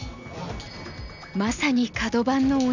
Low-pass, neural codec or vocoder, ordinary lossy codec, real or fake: 7.2 kHz; none; none; real